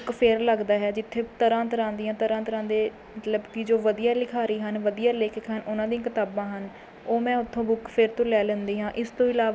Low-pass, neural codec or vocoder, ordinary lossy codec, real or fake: none; none; none; real